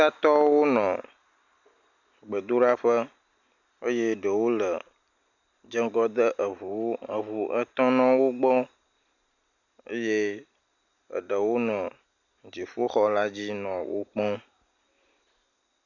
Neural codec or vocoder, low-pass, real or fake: none; 7.2 kHz; real